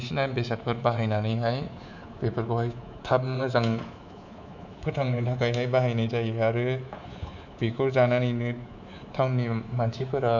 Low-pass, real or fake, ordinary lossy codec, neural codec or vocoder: 7.2 kHz; fake; none; codec, 24 kHz, 3.1 kbps, DualCodec